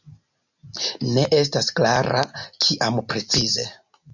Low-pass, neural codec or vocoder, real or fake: 7.2 kHz; none; real